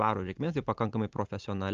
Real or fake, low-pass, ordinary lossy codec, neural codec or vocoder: real; 7.2 kHz; Opus, 24 kbps; none